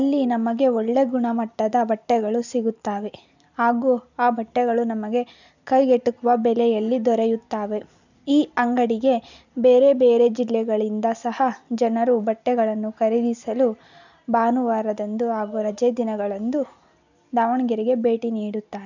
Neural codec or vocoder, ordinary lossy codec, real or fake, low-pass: none; none; real; 7.2 kHz